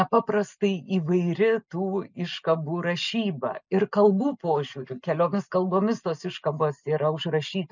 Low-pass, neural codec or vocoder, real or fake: 7.2 kHz; none; real